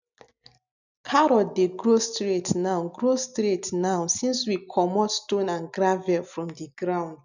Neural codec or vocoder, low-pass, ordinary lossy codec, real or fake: none; 7.2 kHz; none; real